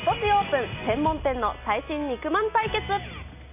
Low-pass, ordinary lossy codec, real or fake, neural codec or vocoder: 3.6 kHz; none; real; none